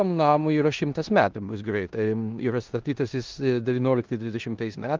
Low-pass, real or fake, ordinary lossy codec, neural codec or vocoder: 7.2 kHz; fake; Opus, 32 kbps; codec, 16 kHz in and 24 kHz out, 0.9 kbps, LongCat-Audio-Codec, four codebook decoder